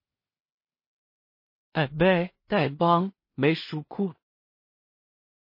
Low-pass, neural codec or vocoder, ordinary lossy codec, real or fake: 5.4 kHz; codec, 16 kHz in and 24 kHz out, 0.4 kbps, LongCat-Audio-Codec, two codebook decoder; MP3, 24 kbps; fake